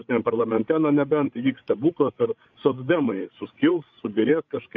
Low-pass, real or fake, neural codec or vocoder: 7.2 kHz; fake; codec, 16 kHz, 8 kbps, FreqCodec, larger model